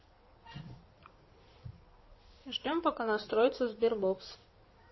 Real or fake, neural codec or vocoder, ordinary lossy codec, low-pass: fake; codec, 16 kHz in and 24 kHz out, 2.2 kbps, FireRedTTS-2 codec; MP3, 24 kbps; 7.2 kHz